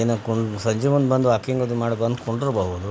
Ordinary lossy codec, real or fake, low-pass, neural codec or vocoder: none; real; none; none